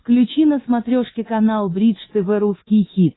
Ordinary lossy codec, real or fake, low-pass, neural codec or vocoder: AAC, 16 kbps; fake; 7.2 kHz; codec, 24 kHz, 3.1 kbps, DualCodec